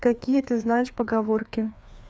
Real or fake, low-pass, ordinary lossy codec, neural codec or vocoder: fake; none; none; codec, 16 kHz, 2 kbps, FreqCodec, larger model